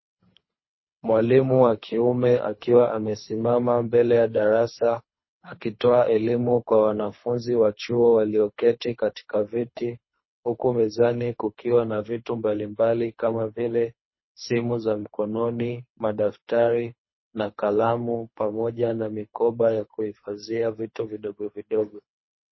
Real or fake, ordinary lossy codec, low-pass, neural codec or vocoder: fake; MP3, 24 kbps; 7.2 kHz; codec, 24 kHz, 3 kbps, HILCodec